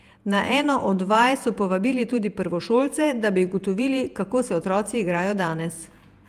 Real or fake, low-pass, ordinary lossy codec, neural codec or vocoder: fake; 14.4 kHz; Opus, 32 kbps; vocoder, 48 kHz, 128 mel bands, Vocos